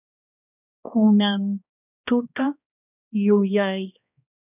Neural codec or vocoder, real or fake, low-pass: codec, 16 kHz, 1 kbps, X-Codec, HuBERT features, trained on balanced general audio; fake; 3.6 kHz